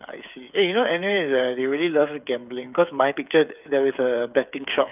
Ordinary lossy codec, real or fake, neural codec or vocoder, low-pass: none; fake; codec, 16 kHz, 8 kbps, FreqCodec, smaller model; 3.6 kHz